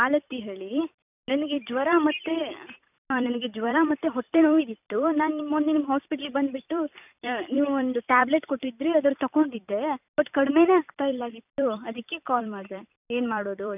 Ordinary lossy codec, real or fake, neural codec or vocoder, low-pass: none; fake; vocoder, 44.1 kHz, 128 mel bands every 256 samples, BigVGAN v2; 3.6 kHz